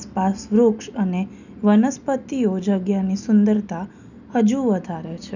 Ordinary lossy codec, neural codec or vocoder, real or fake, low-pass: none; none; real; 7.2 kHz